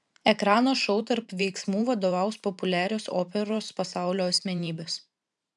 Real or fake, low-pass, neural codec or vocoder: fake; 10.8 kHz; vocoder, 44.1 kHz, 128 mel bands every 512 samples, BigVGAN v2